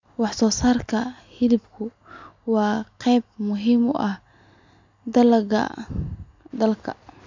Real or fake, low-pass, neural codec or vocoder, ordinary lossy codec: real; 7.2 kHz; none; MP3, 64 kbps